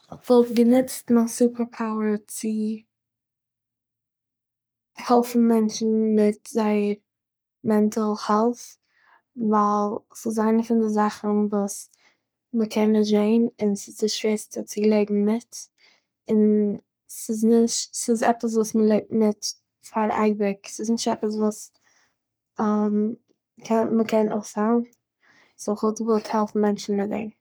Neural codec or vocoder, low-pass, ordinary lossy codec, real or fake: codec, 44.1 kHz, 3.4 kbps, Pupu-Codec; none; none; fake